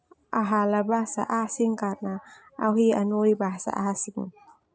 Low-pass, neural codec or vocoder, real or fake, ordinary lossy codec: none; none; real; none